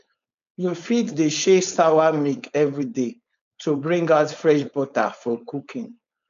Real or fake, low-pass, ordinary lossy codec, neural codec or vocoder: fake; 7.2 kHz; MP3, 64 kbps; codec, 16 kHz, 4.8 kbps, FACodec